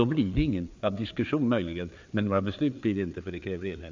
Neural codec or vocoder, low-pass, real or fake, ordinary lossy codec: codec, 16 kHz, 4 kbps, FreqCodec, larger model; 7.2 kHz; fake; MP3, 64 kbps